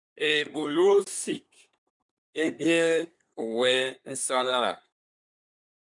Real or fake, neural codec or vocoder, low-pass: fake; codec, 24 kHz, 1 kbps, SNAC; 10.8 kHz